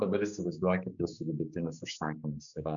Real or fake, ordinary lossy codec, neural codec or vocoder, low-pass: fake; MP3, 96 kbps; codec, 16 kHz, 4 kbps, X-Codec, HuBERT features, trained on general audio; 7.2 kHz